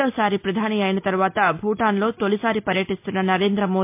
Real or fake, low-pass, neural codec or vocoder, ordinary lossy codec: real; 3.6 kHz; none; MP3, 32 kbps